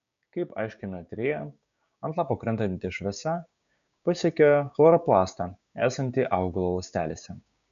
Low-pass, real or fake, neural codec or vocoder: 7.2 kHz; fake; codec, 16 kHz, 6 kbps, DAC